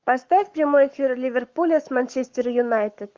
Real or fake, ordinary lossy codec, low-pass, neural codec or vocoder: fake; Opus, 24 kbps; 7.2 kHz; codec, 44.1 kHz, 7.8 kbps, Pupu-Codec